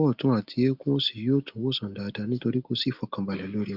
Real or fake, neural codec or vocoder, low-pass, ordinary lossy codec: real; none; 5.4 kHz; Opus, 32 kbps